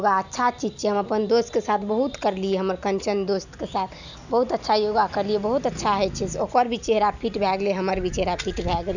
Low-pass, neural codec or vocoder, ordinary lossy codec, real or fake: 7.2 kHz; none; none; real